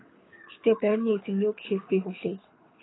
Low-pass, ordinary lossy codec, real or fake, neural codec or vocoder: 7.2 kHz; AAC, 16 kbps; fake; codec, 24 kHz, 6 kbps, HILCodec